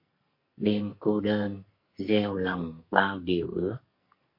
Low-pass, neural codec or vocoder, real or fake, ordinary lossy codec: 5.4 kHz; codec, 44.1 kHz, 2.6 kbps, SNAC; fake; MP3, 32 kbps